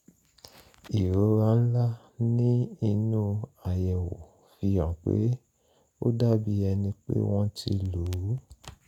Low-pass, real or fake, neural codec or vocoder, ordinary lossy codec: 19.8 kHz; real; none; none